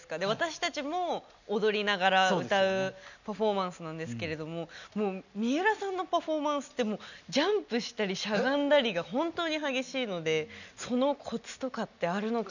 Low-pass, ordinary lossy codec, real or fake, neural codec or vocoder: 7.2 kHz; none; real; none